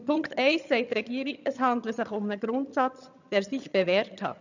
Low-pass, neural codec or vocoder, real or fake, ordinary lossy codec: 7.2 kHz; vocoder, 22.05 kHz, 80 mel bands, HiFi-GAN; fake; none